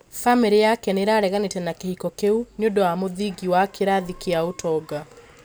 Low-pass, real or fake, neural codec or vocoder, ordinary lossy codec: none; real; none; none